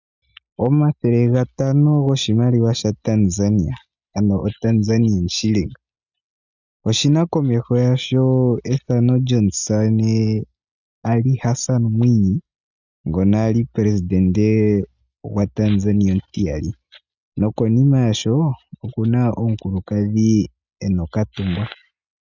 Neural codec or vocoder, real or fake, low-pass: none; real; 7.2 kHz